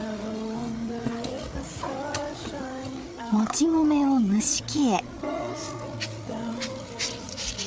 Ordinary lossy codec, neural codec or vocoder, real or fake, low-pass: none; codec, 16 kHz, 8 kbps, FreqCodec, larger model; fake; none